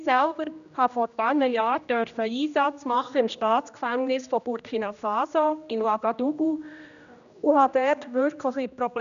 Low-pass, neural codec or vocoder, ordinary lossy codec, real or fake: 7.2 kHz; codec, 16 kHz, 1 kbps, X-Codec, HuBERT features, trained on general audio; none; fake